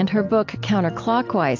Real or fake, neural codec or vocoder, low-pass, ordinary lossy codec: real; none; 7.2 kHz; AAC, 48 kbps